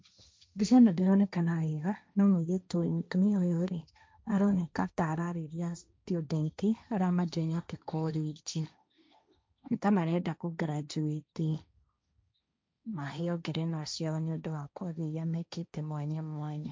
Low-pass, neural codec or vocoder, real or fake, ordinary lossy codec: none; codec, 16 kHz, 1.1 kbps, Voila-Tokenizer; fake; none